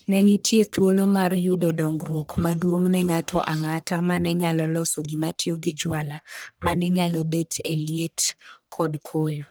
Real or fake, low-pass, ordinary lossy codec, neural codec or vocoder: fake; none; none; codec, 44.1 kHz, 1.7 kbps, Pupu-Codec